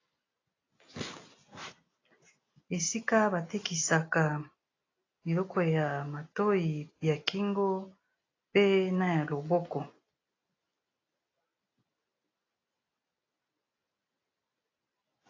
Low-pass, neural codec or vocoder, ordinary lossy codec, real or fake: 7.2 kHz; none; AAC, 32 kbps; real